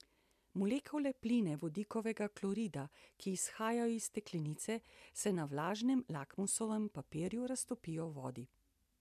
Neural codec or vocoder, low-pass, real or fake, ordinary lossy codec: none; 14.4 kHz; real; MP3, 96 kbps